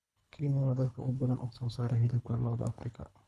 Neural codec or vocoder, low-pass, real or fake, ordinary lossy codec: codec, 24 kHz, 3 kbps, HILCodec; none; fake; none